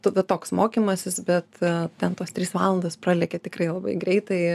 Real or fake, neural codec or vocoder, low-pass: real; none; 14.4 kHz